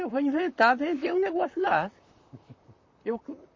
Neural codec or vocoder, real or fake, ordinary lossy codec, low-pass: vocoder, 44.1 kHz, 128 mel bands, Pupu-Vocoder; fake; MP3, 32 kbps; 7.2 kHz